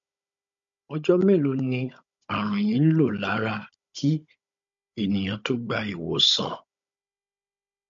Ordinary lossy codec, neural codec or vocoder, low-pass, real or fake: MP3, 48 kbps; codec, 16 kHz, 16 kbps, FunCodec, trained on Chinese and English, 50 frames a second; 7.2 kHz; fake